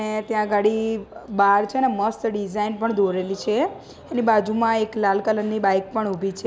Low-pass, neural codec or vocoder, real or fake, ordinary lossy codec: none; none; real; none